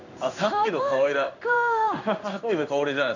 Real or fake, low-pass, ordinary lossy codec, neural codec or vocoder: fake; 7.2 kHz; none; codec, 16 kHz in and 24 kHz out, 1 kbps, XY-Tokenizer